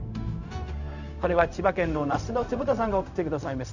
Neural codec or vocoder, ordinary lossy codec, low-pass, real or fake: codec, 16 kHz, 0.4 kbps, LongCat-Audio-Codec; none; 7.2 kHz; fake